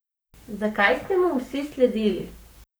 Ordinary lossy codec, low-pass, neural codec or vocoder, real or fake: none; none; codec, 44.1 kHz, 7.8 kbps, Pupu-Codec; fake